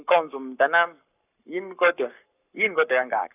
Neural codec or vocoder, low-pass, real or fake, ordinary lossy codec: codec, 44.1 kHz, 7.8 kbps, Pupu-Codec; 3.6 kHz; fake; none